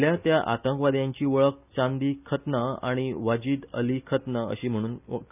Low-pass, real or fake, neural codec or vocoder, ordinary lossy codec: 3.6 kHz; real; none; none